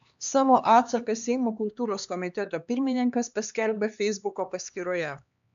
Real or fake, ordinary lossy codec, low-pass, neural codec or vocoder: fake; AAC, 96 kbps; 7.2 kHz; codec, 16 kHz, 2 kbps, X-Codec, HuBERT features, trained on LibriSpeech